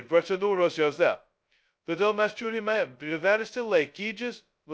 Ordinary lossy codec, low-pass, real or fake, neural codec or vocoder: none; none; fake; codec, 16 kHz, 0.2 kbps, FocalCodec